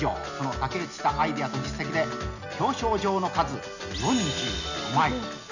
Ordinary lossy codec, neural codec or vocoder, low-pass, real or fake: none; none; 7.2 kHz; real